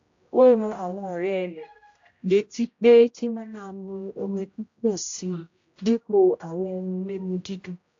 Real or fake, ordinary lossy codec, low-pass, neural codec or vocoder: fake; AAC, 48 kbps; 7.2 kHz; codec, 16 kHz, 0.5 kbps, X-Codec, HuBERT features, trained on general audio